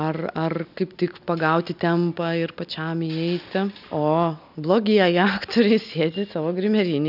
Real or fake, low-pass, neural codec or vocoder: real; 5.4 kHz; none